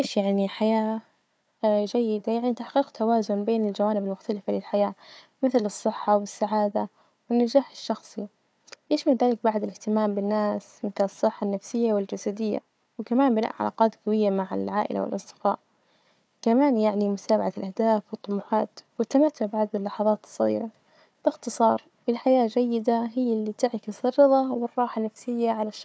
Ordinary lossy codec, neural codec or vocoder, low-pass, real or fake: none; codec, 16 kHz, 4 kbps, FunCodec, trained on Chinese and English, 50 frames a second; none; fake